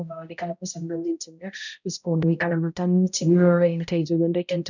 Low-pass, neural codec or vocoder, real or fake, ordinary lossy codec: 7.2 kHz; codec, 16 kHz, 0.5 kbps, X-Codec, HuBERT features, trained on balanced general audio; fake; none